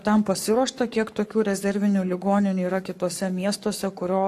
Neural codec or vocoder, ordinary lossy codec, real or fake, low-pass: codec, 44.1 kHz, 7.8 kbps, Pupu-Codec; AAC, 64 kbps; fake; 14.4 kHz